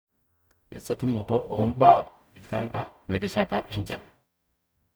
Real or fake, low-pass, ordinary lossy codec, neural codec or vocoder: fake; none; none; codec, 44.1 kHz, 0.9 kbps, DAC